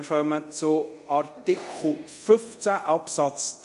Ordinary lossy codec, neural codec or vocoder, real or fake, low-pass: MP3, 48 kbps; codec, 24 kHz, 0.5 kbps, DualCodec; fake; 10.8 kHz